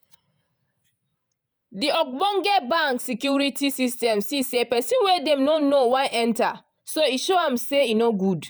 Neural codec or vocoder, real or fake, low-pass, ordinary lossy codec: vocoder, 48 kHz, 128 mel bands, Vocos; fake; none; none